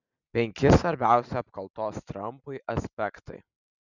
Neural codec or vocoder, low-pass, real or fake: none; 7.2 kHz; real